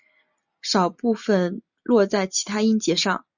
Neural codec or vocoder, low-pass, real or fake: none; 7.2 kHz; real